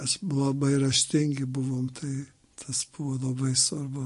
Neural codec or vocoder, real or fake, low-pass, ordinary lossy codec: none; real; 10.8 kHz; MP3, 48 kbps